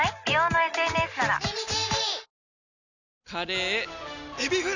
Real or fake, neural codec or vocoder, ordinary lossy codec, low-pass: real; none; none; 7.2 kHz